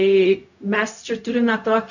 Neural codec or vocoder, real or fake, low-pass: codec, 16 kHz, 0.4 kbps, LongCat-Audio-Codec; fake; 7.2 kHz